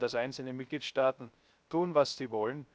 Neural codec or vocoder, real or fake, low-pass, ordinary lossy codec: codec, 16 kHz, 0.3 kbps, FocalCodec; fake; none; none